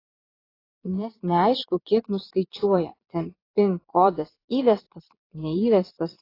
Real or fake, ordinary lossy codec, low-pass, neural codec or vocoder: fake; AAC, 24 kbps; 5.4 kHz; vocoder, 22.05 kHz, 80 mel bands, Vocos